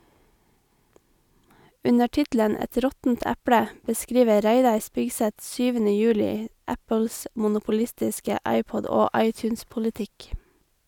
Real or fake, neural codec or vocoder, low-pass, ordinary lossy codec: real; none; 19.8 kHz; none